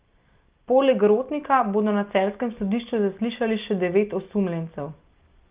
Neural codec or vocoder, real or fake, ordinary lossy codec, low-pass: none; real; Opus, 24 kbps; 3.6 kHz